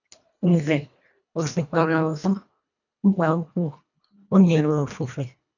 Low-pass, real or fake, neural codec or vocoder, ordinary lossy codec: 7.2 kHz; fake; codec, 24 kHz, 1.5 kbps, HILCodec; none